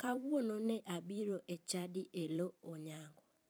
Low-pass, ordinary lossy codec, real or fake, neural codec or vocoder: none; none; fake; vocoder, 44.1 kHz, 128 mel bands every 512 samples, BigVGAN v2